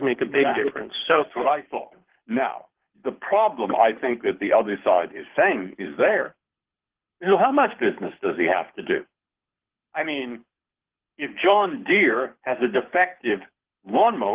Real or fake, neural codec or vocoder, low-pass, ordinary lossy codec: fake; codec, 24 kHz, 6 kbps, HILCodec; 3.6 kHz; Opus, 16 kbps